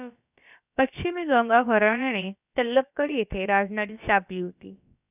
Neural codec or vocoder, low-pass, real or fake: codec, 16 kHz, about 1 kbps, DyCAST, with the encoder's durations; 3.6 kHz; fake